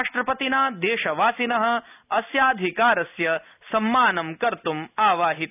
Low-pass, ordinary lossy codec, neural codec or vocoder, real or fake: 3.6 kHz; none; none; real